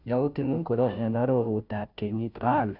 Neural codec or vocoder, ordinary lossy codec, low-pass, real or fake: codec, 16 kHz, 0.5 kbps, FunCodec, trained on Chinese and English, 25 frames a second; none; 5.4 kHz; fake